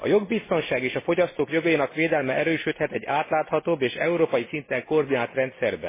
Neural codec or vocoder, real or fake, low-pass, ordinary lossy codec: none; real; 3.6 kHz; MP3, 16 kbps